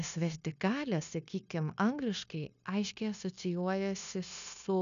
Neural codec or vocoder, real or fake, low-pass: codec, 16 kHz, 0.9 kbps, LongCat-Audio-Codec; fake; 7.2 kHz